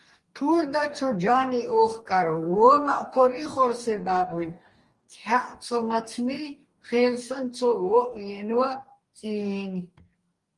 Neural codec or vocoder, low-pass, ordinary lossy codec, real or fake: codec, 44.1 kHz, 2.6 kbps, DAC; 10.8 kHz; Opus, 24 kbps; fake